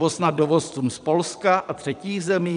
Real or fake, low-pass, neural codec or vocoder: fake; 9.9 kHz; vocoder, 22.05 kHz, 80 mel bands, Vocos